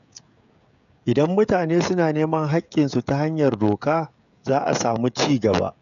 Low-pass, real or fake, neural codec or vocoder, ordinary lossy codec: 7.2 kHz; fake; codec, 16 kHz, 16 kbps, FreqCodec, smaller model; none